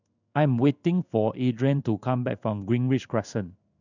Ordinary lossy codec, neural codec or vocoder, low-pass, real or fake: none; codec, 16 kHz in and 24 kHz out, 1 kbps, XY-Tokenizer; 7.2 kHz; fake